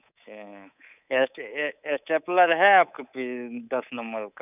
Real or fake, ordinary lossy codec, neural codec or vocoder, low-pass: fake; none; codec, 24 kHz, 3.1 kbps, DualCodec; 3.6 kHz